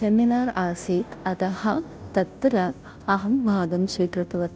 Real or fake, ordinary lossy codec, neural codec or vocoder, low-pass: fake; none; codec, 16 kHz, 0.5 kbps, FunCodec, trained on Chinese and English, 25 frames a second; none